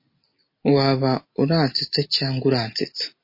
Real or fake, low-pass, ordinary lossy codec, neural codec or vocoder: real; 5.4 kHz; MP3, 24 kbps; none